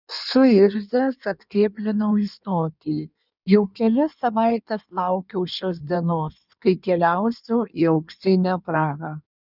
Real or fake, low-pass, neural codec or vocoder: fake; 5.4 kHz; codec, 16 kHz in and 24 kHz out, 1.1 kbps, FireRedTTS-2 codec